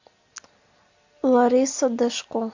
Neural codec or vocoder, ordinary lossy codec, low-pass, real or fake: none; AAC, 48 kbps; 7.2 kHz; real